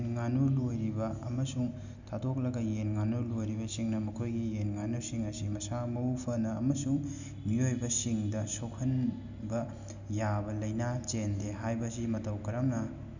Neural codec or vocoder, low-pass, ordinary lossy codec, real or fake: none; 7.2 kHz; none; real